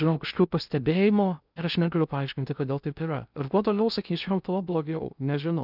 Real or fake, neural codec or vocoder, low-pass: fake; codec, 16 kHz in and 24 kHz out, 0.6 kbps, FocalCodec, streaming, 2048 codes; 5.4 kHz